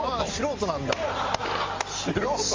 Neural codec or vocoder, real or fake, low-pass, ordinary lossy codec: none; real; 7.2 kHz; Opus, 32 kbps